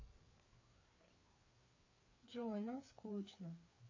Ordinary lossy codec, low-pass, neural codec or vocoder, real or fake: AAC, 32 kbps; 7.2 kHz; codec, 16 kHz, 4 kbps, FreqCodec, larger model; fake